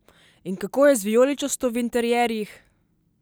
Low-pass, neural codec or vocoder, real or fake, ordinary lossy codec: none; none; real; none